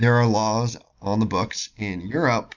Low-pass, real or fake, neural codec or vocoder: 7.2 kHz; real; none